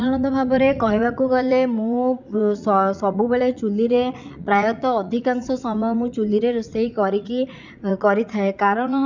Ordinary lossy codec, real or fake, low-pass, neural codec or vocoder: none; fake; 7.2 kHz; vocoder, 44.1 kHz, 80 mel bands, Vocos